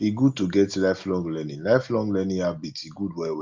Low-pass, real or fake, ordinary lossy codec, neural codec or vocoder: 7.2 kHz; real; Opus, 32 kbps; none